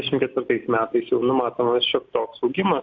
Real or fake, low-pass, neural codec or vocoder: real; 7.2 kHz; none